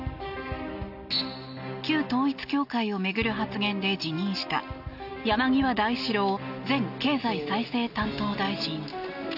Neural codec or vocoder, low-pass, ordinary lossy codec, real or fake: none; 5.4 kHz; none; real